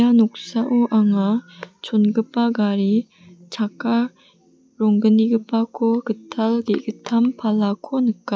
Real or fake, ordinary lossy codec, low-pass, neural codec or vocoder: real; none; none; none